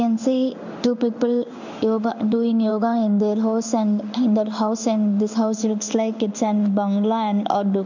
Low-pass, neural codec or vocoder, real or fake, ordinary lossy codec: 7.2 kHz; codec, 16 kHz in and 24 kHz out, 1 kbps, XY-Tokenizer; fake; none